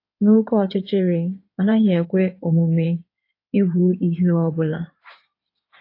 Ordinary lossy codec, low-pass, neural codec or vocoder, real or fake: AAC, 32 kbps; 5.4 kHz; codec, 16 kHz in and 24 kHz out, 2.2 kbps, FireRedTTS-2 codec; fake